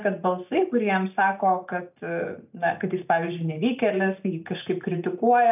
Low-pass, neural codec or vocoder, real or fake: 3.6 kHz; none; real